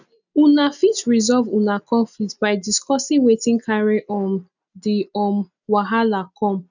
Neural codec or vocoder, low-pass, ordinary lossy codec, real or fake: none; 7.2 kHz; none; real